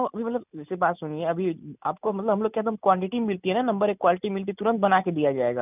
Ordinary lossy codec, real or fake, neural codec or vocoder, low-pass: none; real; none; 3.6 kHz